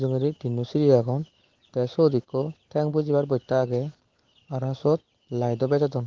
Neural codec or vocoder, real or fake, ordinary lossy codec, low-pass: none; real; Opus, 16 kbps; 7.2 kHz